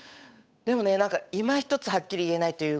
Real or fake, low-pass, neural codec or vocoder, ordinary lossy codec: fake; none; codec, 16 kHz, 8 kbps, FunCodec, trained on Chinese and English, 25 frames a second; none